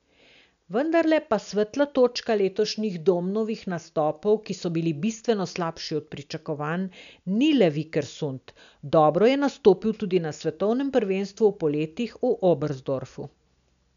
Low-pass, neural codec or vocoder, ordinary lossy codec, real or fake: 7.2 kHz; none; none; real